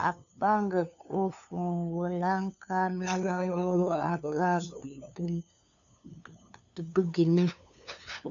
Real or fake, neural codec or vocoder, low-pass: fake; codec, 16 kHz, 2 kbps, FunCodec, trained on LibriTTS, 25 frames a second; 7.2 kHz